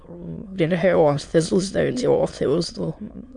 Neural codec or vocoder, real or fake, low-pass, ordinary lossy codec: autoencoder, 22.05 kHz, a latent of 192 numbers a frame, VITS, trained on many speakers; fake; 9.9 kHz; MP3, 64 kbps